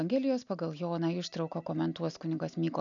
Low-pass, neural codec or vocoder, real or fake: 7.2 kHz; none; real